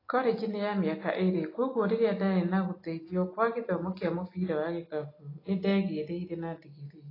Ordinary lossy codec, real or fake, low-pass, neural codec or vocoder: AAC, 24 kbps; real; 5.4 kHz; none